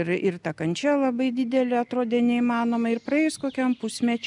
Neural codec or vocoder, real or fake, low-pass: none; real; 10.8 kHz